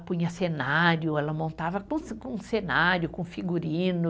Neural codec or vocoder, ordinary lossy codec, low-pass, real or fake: none; none; none; real